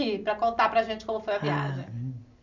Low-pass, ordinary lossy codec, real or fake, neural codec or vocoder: 7.2 kHz; none; real; none